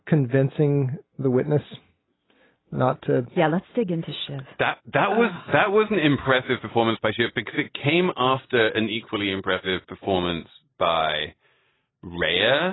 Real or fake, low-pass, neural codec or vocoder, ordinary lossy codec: real; 7.2 kHz; none; AAC, 16 kbps